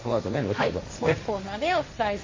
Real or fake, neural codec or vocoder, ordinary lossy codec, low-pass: fake; codec, 16 kHz, 1.1 kbps, Voila-Tokenizer; MP3, 32 kbps; 7.2 kHz